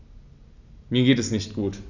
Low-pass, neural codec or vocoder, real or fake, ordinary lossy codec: 7.2 kHz; none; real; none